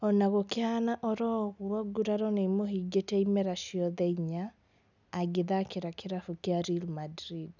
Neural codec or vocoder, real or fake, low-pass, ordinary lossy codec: none; real; 7.2 kHz; none